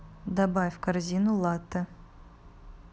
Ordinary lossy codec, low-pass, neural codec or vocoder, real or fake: none; none; none; real